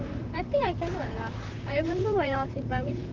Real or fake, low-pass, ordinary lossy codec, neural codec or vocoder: fake; 7.2 kHz; Opus, 24 kbps; vocoder, 44.1 kHz, 128 mel bands, Pupu-Vocoder